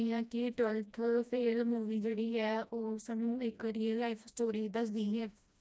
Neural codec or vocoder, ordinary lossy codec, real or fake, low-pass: codec, 16 kHz, 1 kbps, FreqCodec, smaller model; none; fake; none